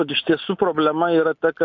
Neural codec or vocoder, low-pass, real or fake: none; 7.2 kHz; real